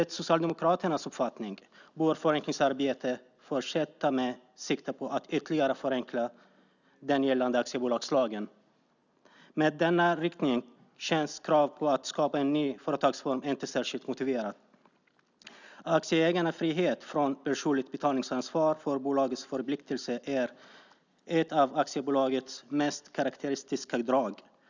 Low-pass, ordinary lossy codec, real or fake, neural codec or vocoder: 7.2 kHz; none; real; none